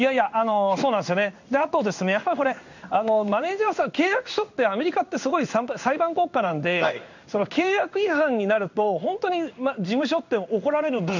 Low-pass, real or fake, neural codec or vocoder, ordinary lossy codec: 7.2 kHz; fake; codec, 16 kHz in and 24 kHz out, 1 kbps, XY-Tokenizer; none